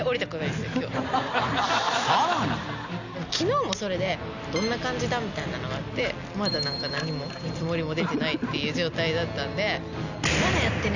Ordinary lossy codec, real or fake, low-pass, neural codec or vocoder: none; real; 7.2 kHz; none